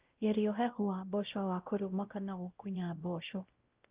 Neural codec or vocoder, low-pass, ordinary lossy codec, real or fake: codec, 16 kHz, 0.5 kbps, X-Codec, WavLM features, trained on Multilingual LibriSpeech; 3.6 kHz; Opus, 24 kbps; fake